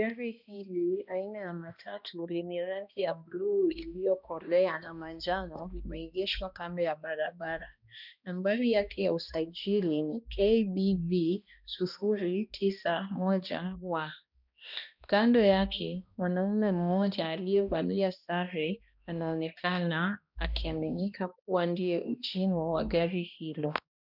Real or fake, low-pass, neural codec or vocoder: fake; 5.4 kHz; codec, 16 kHz, 1 kbps, X-Codec, HuBERT features, trained on balanced general audio